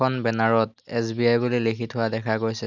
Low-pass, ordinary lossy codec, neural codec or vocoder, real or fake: 7.2 kHz; none; none; real